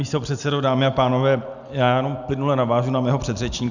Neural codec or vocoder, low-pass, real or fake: none; 7.2 kHz; real